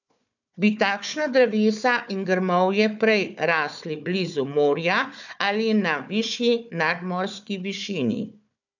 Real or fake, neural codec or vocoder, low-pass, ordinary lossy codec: fake; codec, 16 kHz, 4 kbps, FunCodec, trained on Chinese and English, 50 frames a second; 7.2 kHz; none